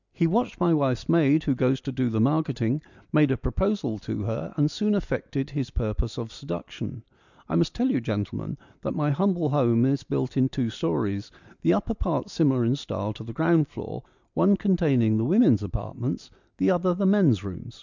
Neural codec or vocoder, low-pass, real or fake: none; 7.2 kHz; real